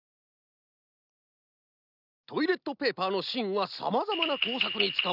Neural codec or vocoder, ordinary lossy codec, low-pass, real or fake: none; none; 5.4 kHz; real